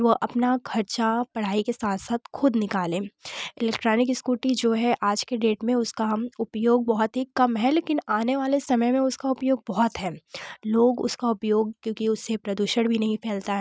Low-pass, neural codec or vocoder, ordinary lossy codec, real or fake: none; none; none; real